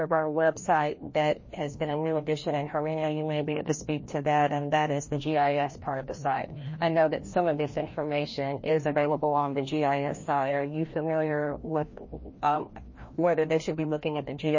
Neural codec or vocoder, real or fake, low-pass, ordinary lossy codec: codec, 16 kHz, 1 kbps, FreqCodec, larger model; fake; 7.2 kHz; MP3, 32 kbps